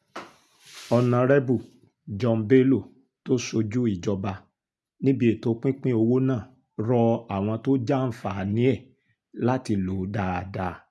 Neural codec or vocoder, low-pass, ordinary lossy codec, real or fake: none; none; none; real